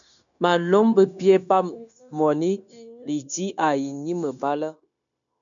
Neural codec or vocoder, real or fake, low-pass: codec, 16 kHz, 0.9 kbps, LongCat-Audio-Codec; fake; 7.2 kHz